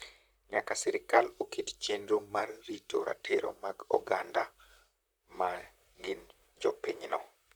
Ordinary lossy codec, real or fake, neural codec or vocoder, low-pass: none; fake; vocoder, 44.1 kHz, 128 mel bands, Pupu-Vocoder; none